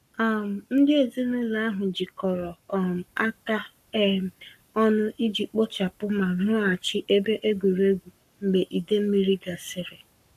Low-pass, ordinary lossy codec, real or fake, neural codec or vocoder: 14.4 kHz; none; fake; codec, 44.1 kHz, 7.8 kbps, Pupu-Codec